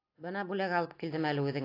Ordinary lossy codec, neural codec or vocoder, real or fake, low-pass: AAC, 32 kbps; none; real; 5.4 kHz